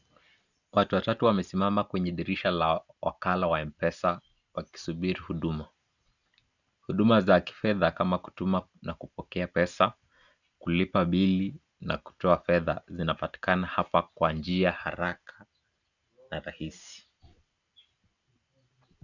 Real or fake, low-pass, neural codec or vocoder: real; 7.2 kHz; none